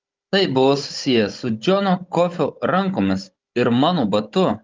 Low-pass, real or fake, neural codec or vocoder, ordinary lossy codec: 7.2 kHz; fake; codec, 16 kHz, 16 kbps, FunCodec, trained on Chinese and English, 50 frames a second; Opus, 32 kbps